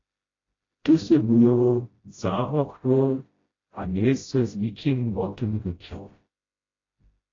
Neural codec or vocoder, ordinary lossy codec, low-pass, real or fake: codec, 16 kHz, 0.5 kbps, FreqCodec, smaller model; AAC, 32 kbps; 7.2 kHz; fake